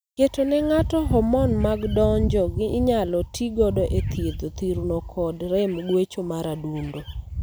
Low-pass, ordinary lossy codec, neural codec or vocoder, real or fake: none; none; none; real